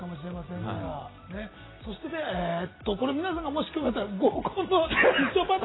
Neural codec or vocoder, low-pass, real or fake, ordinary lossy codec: none; 7.2 kHz; real; AAC, 16 kbps